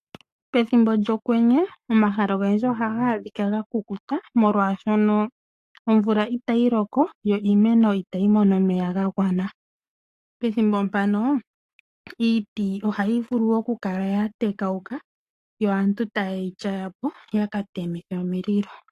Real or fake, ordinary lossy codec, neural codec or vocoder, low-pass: fake; AAC, 64 kbps; codec, 44.1 kHz, 7.8 kbps, Pupu-Codec; 14.4 kHz